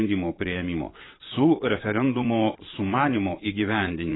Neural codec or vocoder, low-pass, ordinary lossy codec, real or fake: vocoder, 22.05 kHz, 80 mel bands, Vocos; 7.2 kHz; AAC, 16 kbps; fake